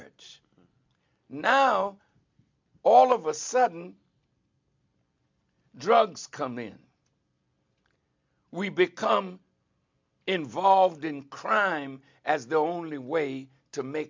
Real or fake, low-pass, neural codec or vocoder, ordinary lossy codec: real; 7.2 kHz; none; MP3, 64 kbps